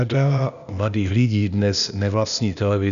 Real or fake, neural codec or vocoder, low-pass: fake; codec, 16 kHz, 0.8 kbps, ZipCodec; 7.2 kHz